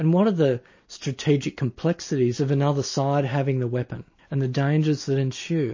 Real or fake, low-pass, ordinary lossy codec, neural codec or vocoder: real; 7.2 kHz; MP3, 32 kbps; none